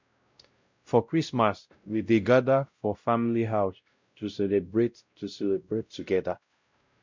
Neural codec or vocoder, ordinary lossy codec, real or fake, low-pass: codec, 16 kHz, 0.5 kbps, X-Codec, WavLM features, trained on Multilingual LibriSpeech; MP3, 64 kbps; fake; 7.2 kHz